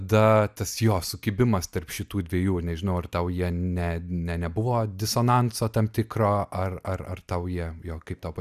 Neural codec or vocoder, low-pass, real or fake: none; 14.4 kHz; real